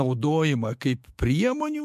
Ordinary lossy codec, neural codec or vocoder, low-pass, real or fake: MP3, 64 kbps; autoencoder, 48 kHz, 128 numbers a frame, DAC-VAE, trained on Japanese speech; 14.4 kHz; fake